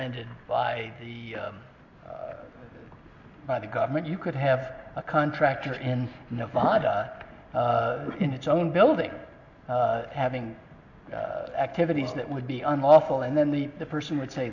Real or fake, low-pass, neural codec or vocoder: real; 7.2 kHz; none